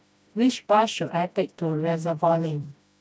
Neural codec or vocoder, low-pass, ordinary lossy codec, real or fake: codec, 16 kHz, 1 kbps, FreqCodec, smaller model; none; none; fake